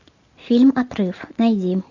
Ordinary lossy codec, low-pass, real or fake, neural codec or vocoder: AAC, 48 kbps; 7.2 kHz; real; none